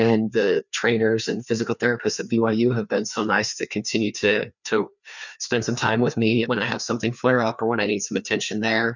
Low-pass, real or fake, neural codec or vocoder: 7.2 kHz; fake; codec, 16 kHz, 2 kbps, FreqCodec, larger model